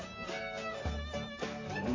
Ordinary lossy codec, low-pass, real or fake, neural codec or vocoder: AAC, 32 kbps; 7.2 kHz; fake; vocoder, 44.1 kHz, 128 mel bands, Pupu-Vocoder